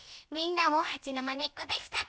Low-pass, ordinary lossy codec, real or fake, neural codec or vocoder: none; none; fake; codec, 16 kHz, about 1 kbps, DyCAST, with the encoder's durations